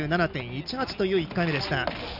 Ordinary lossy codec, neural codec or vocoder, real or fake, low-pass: none; none; real; 5.4 kHz